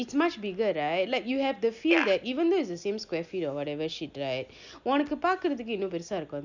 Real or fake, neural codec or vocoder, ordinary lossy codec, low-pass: real; none; none; 7.2 kHz